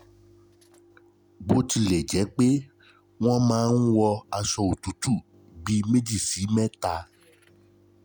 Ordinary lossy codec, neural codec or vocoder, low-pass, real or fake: none; none; none; real